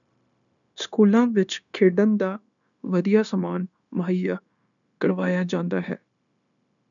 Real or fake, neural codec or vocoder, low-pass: fake; codec, 16 kHz, 0.9 kbps, LongCat-Audio-Codec; 7.2 kHz